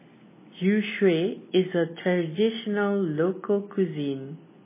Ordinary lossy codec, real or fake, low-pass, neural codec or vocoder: MP3, 16 kbps; real; 3.6 kHz; none